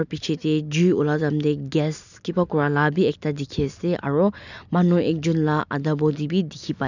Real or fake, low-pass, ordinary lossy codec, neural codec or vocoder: real; 7.2 kHz; none; none